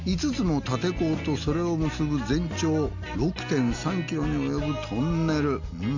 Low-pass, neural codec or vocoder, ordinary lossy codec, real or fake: 7.2 kHz; none; Opus, 64 kbps; real